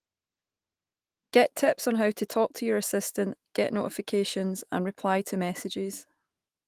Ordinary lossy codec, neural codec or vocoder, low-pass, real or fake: Opus, 24 kbps; autoencoder, 48 kHz, 128 numbers a frame, DAC-VAE, trained on Japanese speech; 14.4 kHz; fake